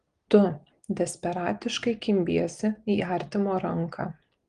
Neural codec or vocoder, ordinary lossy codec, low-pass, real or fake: none; Opus, 24 kbps; 9.9 kHz; real